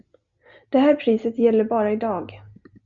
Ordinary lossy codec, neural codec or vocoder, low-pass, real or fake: Opus, 64 kbps; none; 7.2 kHz; real